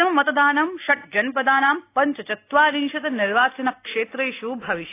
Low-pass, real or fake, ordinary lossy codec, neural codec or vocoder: 3.6 kHz; real; AAC, 24 kbps; none